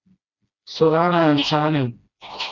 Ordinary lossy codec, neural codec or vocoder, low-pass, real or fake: Opus, 64 kbps; codec, 16 kHz, 1 kbps, FreqCodec, smaller model; 7.2 kHz; fake